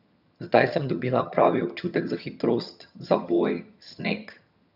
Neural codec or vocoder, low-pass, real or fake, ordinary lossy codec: vocoder, 22.05 kHz, 80 mel bands, HiFi-GAN; 5.4 kHz; fake; none